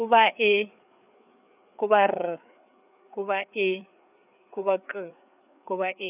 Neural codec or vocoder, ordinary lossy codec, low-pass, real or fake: codec, 16 kHz, 4 kbps, FreqCodec, larger model; none; 3.6 kHz; fake